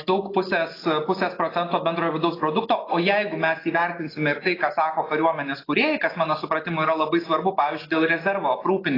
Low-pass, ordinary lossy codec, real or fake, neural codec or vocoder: 5.4 kHz; AAC, 24 kbps; real; none